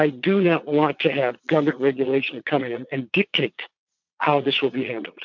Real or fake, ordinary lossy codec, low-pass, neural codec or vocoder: fake; MP3, 64 kbps; 7.2 kHz; vocoder, 22.05 kHz, 80 mel bands, Vocos